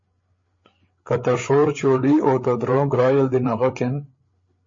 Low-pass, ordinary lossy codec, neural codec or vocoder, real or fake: 7.2 kHz; MP3, 32 kbps; codec, 16 kHz, 8 kbps, FreqCodec, larger model; fake